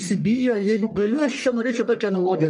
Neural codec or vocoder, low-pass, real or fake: codec, 44.1 kHz, 1.7 kbps, Pupu-Codec; 10.8 kHz; fake